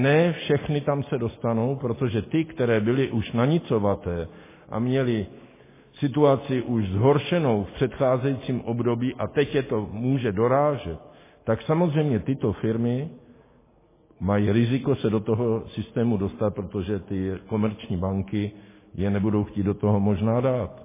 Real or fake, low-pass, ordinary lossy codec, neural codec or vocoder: real; 3.6 kHz; MP3, 16 kbps; none